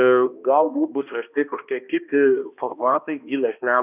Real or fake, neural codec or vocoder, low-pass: fake; codec, 16 kHz, 1 kbps, X-Codec, HuBERT features, trained on balanced general audio; 3.6 kHz